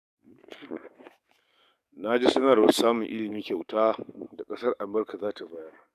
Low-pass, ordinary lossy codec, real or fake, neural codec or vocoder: 14.4 kHz; none; fake; codec, 44.1 kHz, 7.8 kbps, DAC